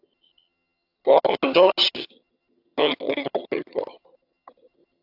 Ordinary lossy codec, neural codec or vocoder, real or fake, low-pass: AAC, 48 kbps; vocoder, 22.05 kHz, 80 mel bands, HiFi-GAN; fake; 5.4 kHz